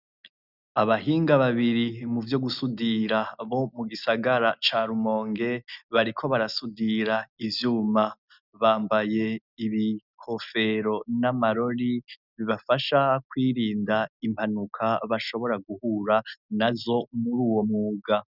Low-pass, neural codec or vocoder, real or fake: 5.4 kHz; none; real